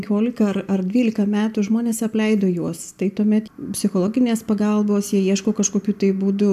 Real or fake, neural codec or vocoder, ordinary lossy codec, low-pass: real; none; AAC, 96 kbps; 14.4 kHz